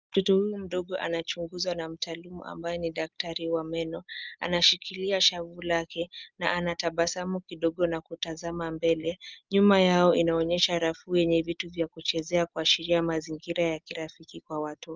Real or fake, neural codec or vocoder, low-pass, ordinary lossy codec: real; none; 7.2 kHz; Opus, 24 kbps